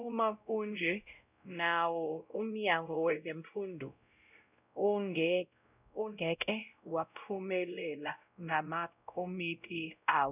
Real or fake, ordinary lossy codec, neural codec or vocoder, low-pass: fake; none; codec, 16 kHz, 0.5 kbps, X-Codec, WavLM features, trained on Multilingual LibriSpeech; 3.6 kHz